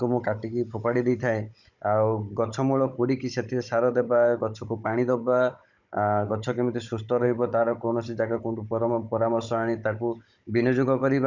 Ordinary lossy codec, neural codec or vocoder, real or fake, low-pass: none; codec, 16 kHz, 16 kbps, FunCodec, trained on Chinese and English, 50 frames a second; fake; 7.2 kHz